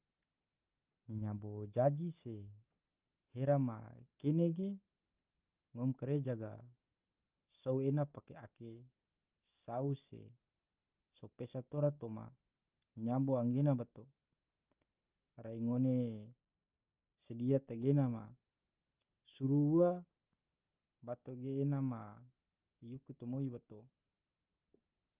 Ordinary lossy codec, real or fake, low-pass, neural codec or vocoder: Opus, 32 kbps; real; 3.6 kHz; none